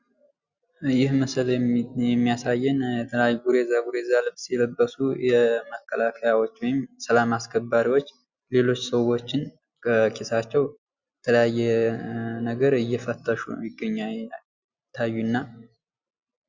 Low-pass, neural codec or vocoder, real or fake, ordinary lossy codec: 7.2 kHz; none; real; Opus, 64 kbps